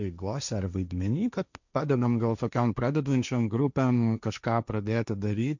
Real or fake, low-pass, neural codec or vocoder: fake; 7.2 kHz; codec, 16 kHz, 1.1 kbps, Voila-Tokenizer